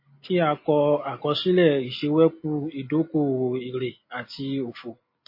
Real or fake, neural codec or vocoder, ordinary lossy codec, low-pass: real; none; MP3, 24 kbps; 5.4 kHz